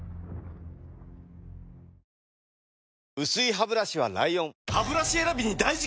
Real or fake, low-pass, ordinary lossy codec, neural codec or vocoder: real; none; none; none